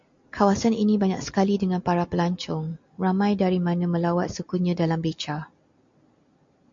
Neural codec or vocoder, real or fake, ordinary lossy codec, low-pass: none; real; MP3, 48 kbps; 7.2 kHz